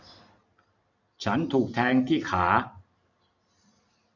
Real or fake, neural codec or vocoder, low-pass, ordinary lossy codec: fake; vocoder, 44.1 kHz, 128 mel bands every 512 samples, BigVGAN v2; 7.2 kHz; none